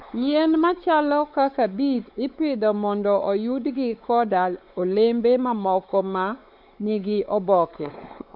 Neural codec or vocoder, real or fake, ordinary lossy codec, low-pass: codec, 16 kHz, 4 kbps, X-Codec, WavLM features, trained on Multilingual LibriSpeech; fake; none; 5.4 kHz